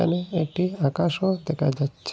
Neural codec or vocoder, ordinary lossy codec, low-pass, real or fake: none; none; none; real